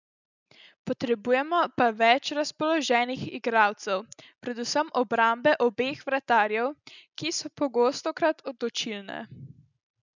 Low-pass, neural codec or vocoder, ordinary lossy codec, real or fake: 7.2 kHz; none; none; real